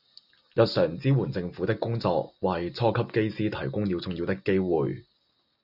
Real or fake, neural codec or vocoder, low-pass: real; none; 5.4 kHz